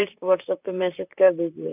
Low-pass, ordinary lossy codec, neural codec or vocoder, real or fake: 3.6 kHz; none; vocoder, 44.1 kHz, 128 mel bands, Pupu-Vocoder; fake